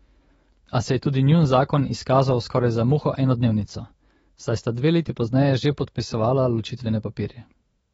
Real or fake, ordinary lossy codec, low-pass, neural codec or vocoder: fake; AAC, 24 kbps; 19.8 kHz; autoencoder, 48 kHz, 128 numbers a frame, DAC-VAE, trained on Japanese speech